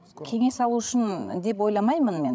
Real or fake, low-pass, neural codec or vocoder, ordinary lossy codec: fake; none; codec, 16 kHz, 16 kbps, FreqCodec, larger model; none